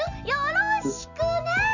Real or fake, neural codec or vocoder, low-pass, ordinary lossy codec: real; none; 7.2 kHz; none